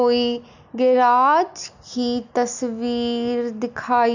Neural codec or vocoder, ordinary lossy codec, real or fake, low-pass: none; none; real; 7.2 kHz